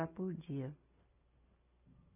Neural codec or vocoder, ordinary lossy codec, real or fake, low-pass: none; none; real; 3.6 kHz